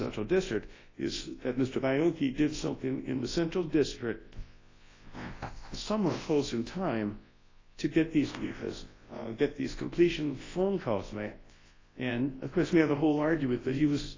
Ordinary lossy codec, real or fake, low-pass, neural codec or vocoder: AAC, 32 kbps; fake; 7.2 kHz; codec, 24 kHz, 0.9 kbps, WavTokenizer, large speech release